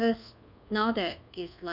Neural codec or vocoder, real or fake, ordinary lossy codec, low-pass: codec, 24 kHz, 1.2 kbps, DualCodec; fake; none; 5.4 kHz